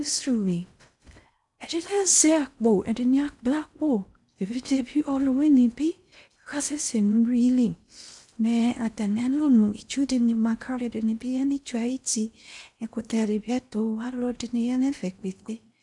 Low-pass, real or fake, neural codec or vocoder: 10.8 kHz; fake; codec, 16 kHz in and 24 kHz out, 0.6 kbps, FocalCodec, streaming, 2048 codes